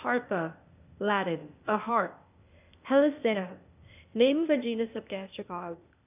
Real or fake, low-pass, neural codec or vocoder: fake; 3.6 kHz; codec, 16 kHz, 0.8 kbps, ZipCodec